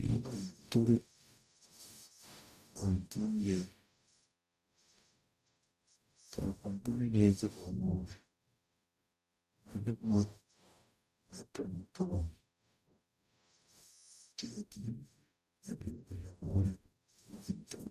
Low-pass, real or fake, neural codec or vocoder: 14.4 kHz; fake; codec, 44.1 kHz, 0.9 kbps, DAC